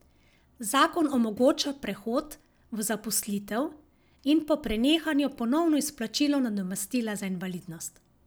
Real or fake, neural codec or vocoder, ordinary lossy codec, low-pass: real; none; none; none